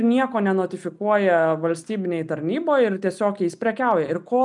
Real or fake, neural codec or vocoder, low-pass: real; none; 10.8 kHz